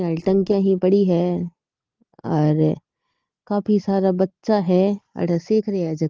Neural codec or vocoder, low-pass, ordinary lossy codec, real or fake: none; 7.2 kHz; Opus, 16 kbps; real